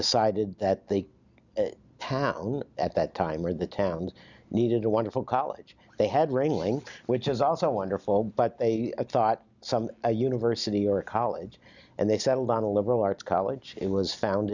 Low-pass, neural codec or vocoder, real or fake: 7.2 kHz; none; real